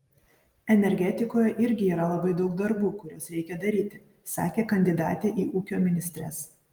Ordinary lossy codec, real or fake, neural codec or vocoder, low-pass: Opus, 32 kbps; real; none; 19.8 kHz